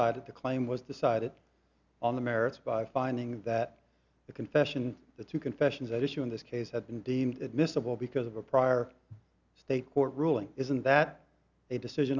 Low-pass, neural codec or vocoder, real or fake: 7.2 kHz; none; real